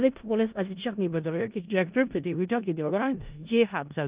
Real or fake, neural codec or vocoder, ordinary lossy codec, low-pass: fake; codec, 16 kHz in and 24 kHz out, 0.4 kbps, LongCat-Audio-Codec, four codebook decoder; Opus, 32 kbps; 3.6 kHz